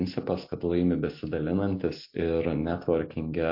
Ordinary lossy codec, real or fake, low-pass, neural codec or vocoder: MP3, 32 kbps; real; 5.4 kHz; none